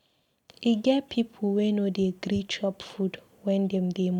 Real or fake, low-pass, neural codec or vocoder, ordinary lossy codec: real; 19.8 kHz; none; none